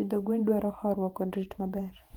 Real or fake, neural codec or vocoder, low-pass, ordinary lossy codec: fake; vocoder, 48 kHz, 128 mel bands, Vocos; 19.8 kHz; Opus, 24 kbps